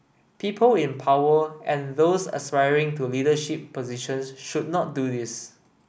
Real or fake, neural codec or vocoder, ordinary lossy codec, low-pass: real; none; none; none